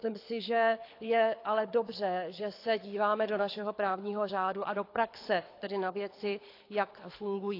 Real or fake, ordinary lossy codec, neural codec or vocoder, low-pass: fake; AAC, 32 kbps; codec, 24 kHz, 6 kbps, HILCodec; 5.4 kHz